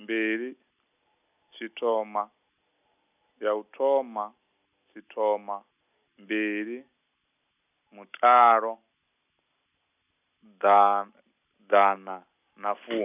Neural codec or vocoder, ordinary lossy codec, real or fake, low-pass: none; none; real; 3.6 kHz